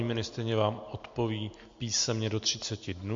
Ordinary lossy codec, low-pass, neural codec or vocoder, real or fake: MP3, 48 kbps; 7.2 kHz; none; real